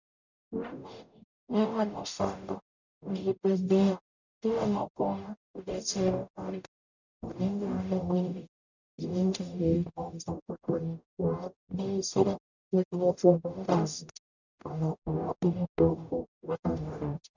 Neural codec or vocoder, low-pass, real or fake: codec, 44.1 kHz, 0.9 kbps, DAC; 7.2 kHz; fake